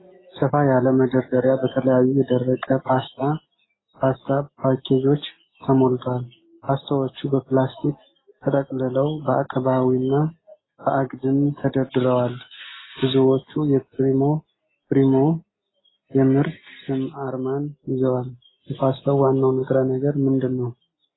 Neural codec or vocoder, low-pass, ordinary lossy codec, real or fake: none; 7.2 kHz; AAC, 16 kbps; real